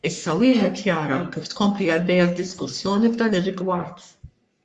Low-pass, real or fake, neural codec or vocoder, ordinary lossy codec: 10.8 kHz; fake; codec, 44.1 kHz, 3.4 kbps, Pupu-Codec; Opus, 64 kbps